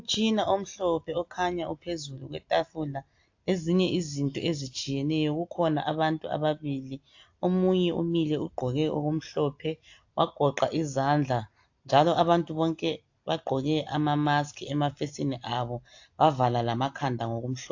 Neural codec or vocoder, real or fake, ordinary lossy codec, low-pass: none; real; AAC, 48 kbps; 7.2 kHz